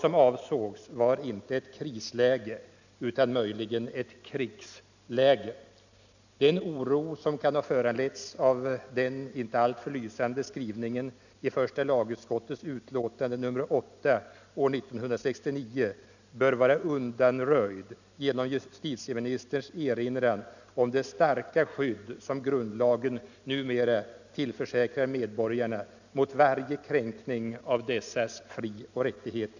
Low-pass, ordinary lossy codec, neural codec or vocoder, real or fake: 7.2 kHz; none; none; real